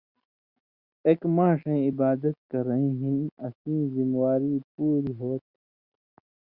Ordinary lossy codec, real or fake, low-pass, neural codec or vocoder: Opus, 64 kbps; real; 5.4 kHz; none